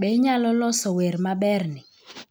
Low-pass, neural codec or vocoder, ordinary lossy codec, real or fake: none; none; none; real